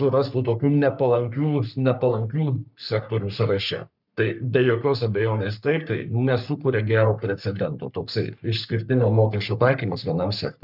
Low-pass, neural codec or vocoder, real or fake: 5.4 kHz; codec, 44.1 kHz, 3.4 kbps, Pupu-Codec; fake